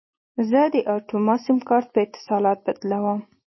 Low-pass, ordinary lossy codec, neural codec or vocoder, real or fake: 7.2 kHz; MP3, 24 kbps; none; real